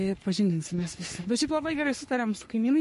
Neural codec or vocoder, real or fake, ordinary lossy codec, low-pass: codec, 44.1 kHz, 3.4 kbps, Pupu-Codec; fake; MP3, 48 kbps; 14.4 kHz